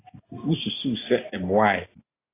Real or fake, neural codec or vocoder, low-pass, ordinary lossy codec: real; none; 3.6 kHz; AAC, 24 kbps